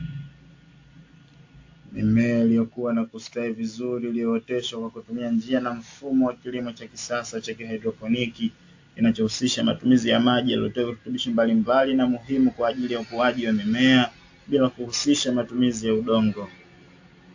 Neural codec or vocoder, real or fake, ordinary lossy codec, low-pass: none; real; AAC, 48 kbps; 7.2 kHz